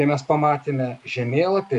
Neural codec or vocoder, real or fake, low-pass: none; real; 10.8 kHz